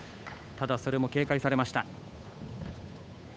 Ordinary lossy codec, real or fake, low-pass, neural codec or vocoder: none; fake; none; codec, 16 kHz, 8 kbps, FunCodec, trained on Chinese and English, 25 frames a second